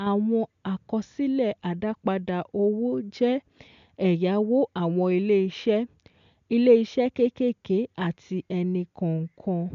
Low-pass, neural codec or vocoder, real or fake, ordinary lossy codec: 7.2 kHz; none; real; MP3, 64 kbps